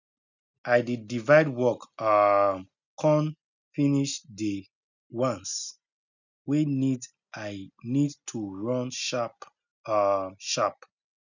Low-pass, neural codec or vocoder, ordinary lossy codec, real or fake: 7.2 kHz; none; none; real